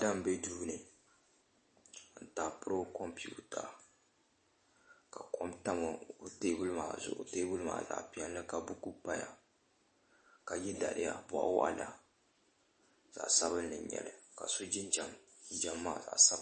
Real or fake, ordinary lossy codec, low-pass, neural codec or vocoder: real; MP3, 32 kbps; 10.8 kHz; none